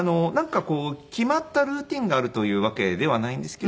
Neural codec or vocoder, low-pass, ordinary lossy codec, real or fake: none; none; none; real